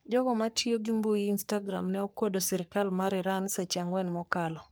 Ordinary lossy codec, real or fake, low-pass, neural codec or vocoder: none; fake; none; codec, 44.1 kHz, 3.4 kbps, Pupu-Codec